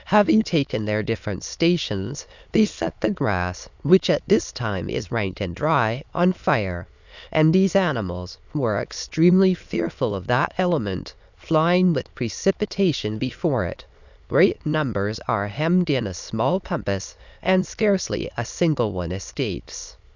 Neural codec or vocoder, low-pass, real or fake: autoencoder, 22.05 kHz, a latent of 192 numbers a frame, VITS, trained on many speakers; 7.2 kHz; fake